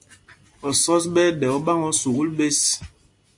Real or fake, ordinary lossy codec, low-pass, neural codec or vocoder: real; AAC, 64 kbps; 10.8 kHz; none